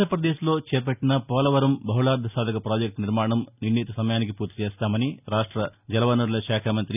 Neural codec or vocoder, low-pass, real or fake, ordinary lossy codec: none; 3.6 kHz; real; none